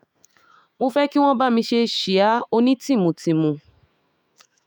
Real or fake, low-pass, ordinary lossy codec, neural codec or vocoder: fake; none; none; autoencoder, 48 kHz, 128 numbers a frame, DAC-VAE, trained on Japanese speech